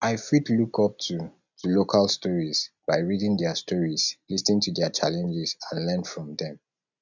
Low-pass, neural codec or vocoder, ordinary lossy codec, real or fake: 7.2 kHz; none; none; real